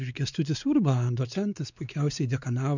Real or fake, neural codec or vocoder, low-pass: fake; codec, 16 kHz, 4 kbps, X-Codec, WavLM features, trained on Multilingual LibriSpeech; 7.2 kHz